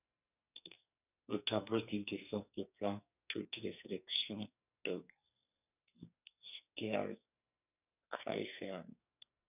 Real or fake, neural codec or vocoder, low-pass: fake; codec, 44.1 kHz, 2.6 kbps, SNAC; 3.6 kHz